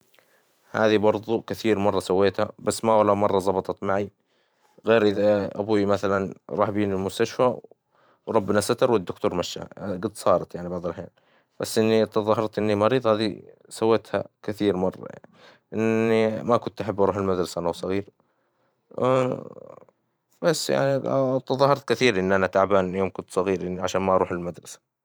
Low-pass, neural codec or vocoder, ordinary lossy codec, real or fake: none; vocoder, 44.1 kHz, 128 mel bands every 512 samples, BigVGAN v2; none; fake